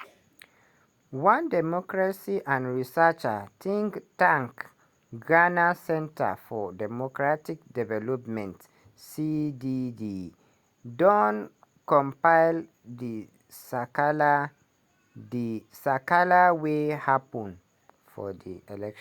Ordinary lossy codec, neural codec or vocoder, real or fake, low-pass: Opus, 64 kbps; none; real; 19.8 kHz